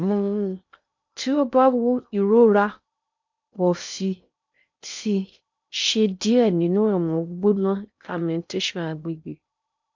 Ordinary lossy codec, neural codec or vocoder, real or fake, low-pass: MP3, 64 kbps; codec, 16 kHz in and 24 kHz out, 0.6 kbps, FocalCodec, streaming, 2048 codes; fake; 7.2 kHz